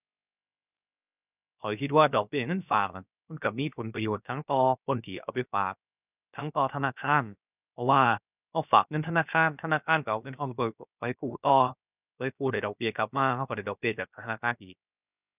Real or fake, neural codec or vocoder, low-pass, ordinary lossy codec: fake; codec, 16 kHz, 0.7 kbps, FocalCodec; 3.6 kHz; none